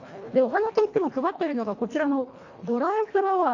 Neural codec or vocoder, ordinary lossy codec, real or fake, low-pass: codec, 24 kHz, 1.5 kbps, HILCodec; AAC, 48 kbps; fake; 7.2 kHz